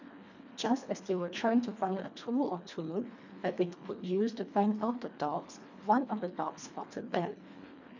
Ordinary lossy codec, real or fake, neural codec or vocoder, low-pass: none; fake; codec, 24 kHz, 1.5 kbps, HILCodec; 7.2 kHz